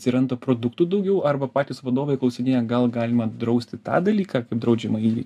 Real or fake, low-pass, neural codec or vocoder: real; 14.4 kHz; none